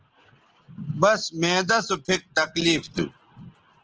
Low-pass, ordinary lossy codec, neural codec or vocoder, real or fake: 7.2 kHz; Opus, 16 kbps; none; real